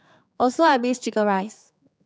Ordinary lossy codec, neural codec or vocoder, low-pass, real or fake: none; codec, 16 kHz, 4 kbps, X-Codec, HuBERT features, trained on general audio; none; fake